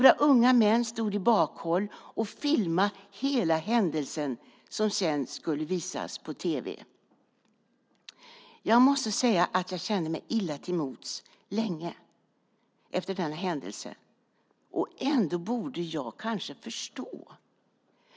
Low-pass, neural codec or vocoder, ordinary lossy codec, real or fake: none; none; none; real